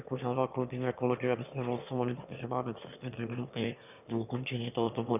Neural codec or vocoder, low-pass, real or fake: autoencoder, 22.05 kHz, a latent of 192 numbers a frame, VITS, trained on one speaker; 3.6 kHz; fake